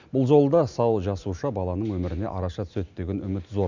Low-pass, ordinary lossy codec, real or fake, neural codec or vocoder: 7.2 kHz; none; real; none